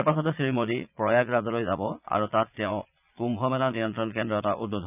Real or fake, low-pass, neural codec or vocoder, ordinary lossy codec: fake; 3.6 kHz; vocoder, 22.05 kHz, 80 mel bands, Vocos; none